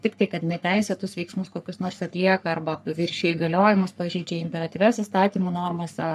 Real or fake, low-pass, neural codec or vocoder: fake; 14.4 kHz; codec, 44.1 kHz, 3.4 kbps, Pupu-Codec